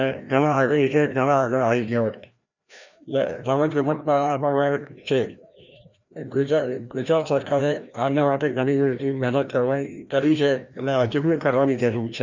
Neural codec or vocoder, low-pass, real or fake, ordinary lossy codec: codec, 16 kHz, 1 kbps, FreqCodec, larger model; 7.2 kHz; fake; none